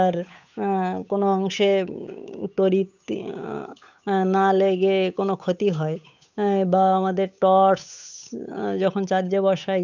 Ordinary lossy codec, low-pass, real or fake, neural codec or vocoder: none; 7.2 kHz; fake; codec, 16 kHz, 8 kbps, FunCodec, trained on Chinese and English, 25 frames a second